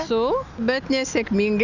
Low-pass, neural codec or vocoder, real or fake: 7.2 kHz; none; real